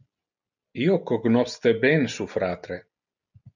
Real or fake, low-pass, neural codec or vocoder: real; 7.2 kHz; none